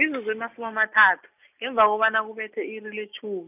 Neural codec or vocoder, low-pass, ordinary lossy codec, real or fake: none; 3.6 kHz; none; real